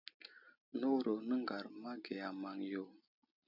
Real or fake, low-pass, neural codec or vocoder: real; 5.4 kHz; none